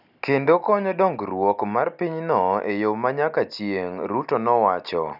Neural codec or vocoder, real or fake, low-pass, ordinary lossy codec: none; real; 5.4 kHz; none